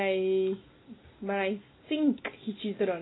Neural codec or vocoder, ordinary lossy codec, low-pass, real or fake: none; AAC, 16 kbps; 7.2 kHz; real